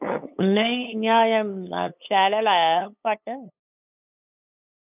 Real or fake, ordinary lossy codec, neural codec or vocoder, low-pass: fake; none; codec, 16 kHz, 8 kbps, FunCodec, trained on LibriTTS, 25 frames a second; 3.6 kHz